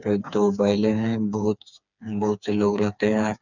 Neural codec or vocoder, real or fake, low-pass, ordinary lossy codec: codec, 16 kHz, 4 kbps, FreqCodec, smaller model; fake; 7.2 kHz; none